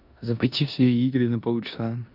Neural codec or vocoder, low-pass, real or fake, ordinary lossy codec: codec, 16 kHz in and 24 kHz out, 0.9 kbps, LongCat-Audio-Codec, four codebook decoder; 5.4 kHz; fake; none